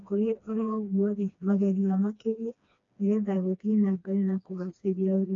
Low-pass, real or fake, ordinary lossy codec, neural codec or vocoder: 7.2 kHz; fake; AAC, 48 kbps; codec, 16 kHz, 2 kbps, FreqCodec, smaller model